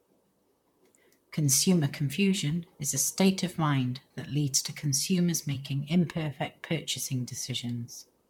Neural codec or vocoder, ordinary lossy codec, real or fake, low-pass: vocoder, 44.1 kHz, 128 mel bands, Pupu-Vocoder; MP3, 96 kbps; fake; 19.8 kHz